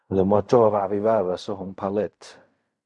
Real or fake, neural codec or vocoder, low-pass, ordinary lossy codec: fake; codec, 16 kHz in and 24 kHz out, 0.4 kbps, LongCat-Audio-Codec, fine tuned four codebook decoder; 10.8 kHz; MP3, 64 kbps